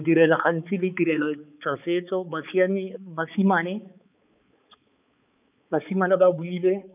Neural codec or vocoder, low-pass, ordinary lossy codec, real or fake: codec, 16 kHz, 4 kbps, X-Codec, HuBERT features, trained on balanced general audio; 3.6 kHz; none; fake